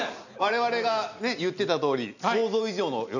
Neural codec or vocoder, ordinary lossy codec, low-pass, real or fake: none; none; 7.2 kHz; real